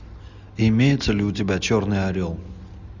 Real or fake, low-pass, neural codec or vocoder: real; 7.2 kHz; none